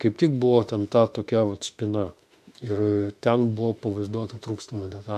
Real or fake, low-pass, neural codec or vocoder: fake; 14.4 kHz; autoencoder, 48 kHz, 32 numbers a frame, DAC-VAE, trained on Japanese speech